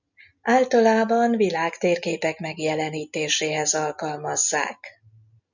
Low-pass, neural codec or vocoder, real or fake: 7.2 kHz; none; real